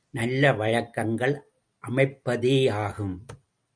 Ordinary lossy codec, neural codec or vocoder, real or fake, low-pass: MP3, 96 kbps; none; real; 9.9 kHz